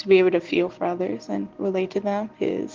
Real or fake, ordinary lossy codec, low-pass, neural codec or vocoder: real; Opus, 16 kbps; 7.2 kHz; none